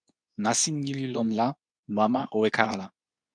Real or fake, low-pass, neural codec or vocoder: fake; 9.9 kHz; codec, 24 kHz, 0.9 kbps, WavTokenizer, medium speech release version 2